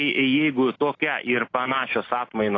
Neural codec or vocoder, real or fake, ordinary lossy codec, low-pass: none; real; AAC, 32 kbps; 7.2 kHz